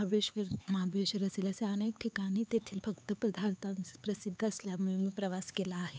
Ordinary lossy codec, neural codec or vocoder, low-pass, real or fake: none; codec, 16 kHz, 4 kbps, X-Codec, HuBERT features, trained on LibriSpeech; none; fake